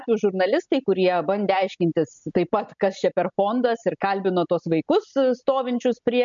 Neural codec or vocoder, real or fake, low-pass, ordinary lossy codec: none; real; 7.2 kHz; MP3, 64 kbps